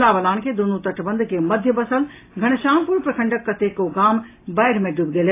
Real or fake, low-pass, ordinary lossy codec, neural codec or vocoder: real; 3.6 kHz; AAC, 24 kbps; none